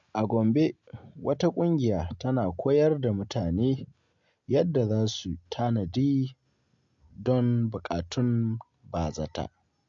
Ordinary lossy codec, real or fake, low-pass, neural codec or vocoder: MP3, 48 kbps; real; 7.2 kHz; none